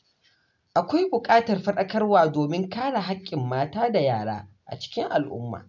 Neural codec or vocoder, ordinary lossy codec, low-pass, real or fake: none; none; 7.2 kHz; real